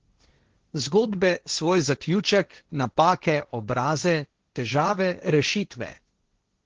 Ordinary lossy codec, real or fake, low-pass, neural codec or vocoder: Opus, 16 kbps; fake; 7.2 kHz; codec, 16 kHz, 1.1 kbps, Voila-Tokenizer